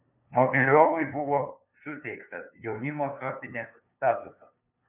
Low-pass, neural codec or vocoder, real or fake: 3.6 kHz; codec, 16 kHz, 2 kbps, FunCodec, trained on LibriTTS, 25 frames a second; fake